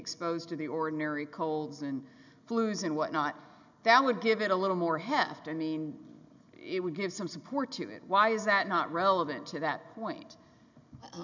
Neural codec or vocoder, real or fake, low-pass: none; real; 7.2 kHz